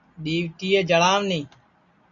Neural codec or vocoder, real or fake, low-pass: none; real; 7.2 kHz